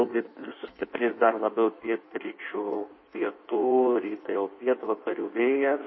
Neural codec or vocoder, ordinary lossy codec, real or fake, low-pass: codec, 16 kHz in and 24 kHz out, 1.1 kbps, FireRedTTS-2 codec; MP3, 24 kbps; fake; 7.2 kHz